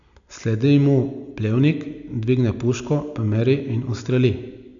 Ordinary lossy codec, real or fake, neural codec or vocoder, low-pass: none; real; none; 7.2 kHz